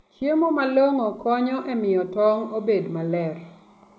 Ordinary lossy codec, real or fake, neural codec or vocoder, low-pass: none; real; none; none